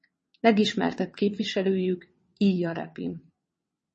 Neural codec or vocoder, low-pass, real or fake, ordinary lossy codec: vocoder, 22.05 kHz, 80 mel bands, WaveNeXt; 9.9 kHz; fake; MP3, 32 kbps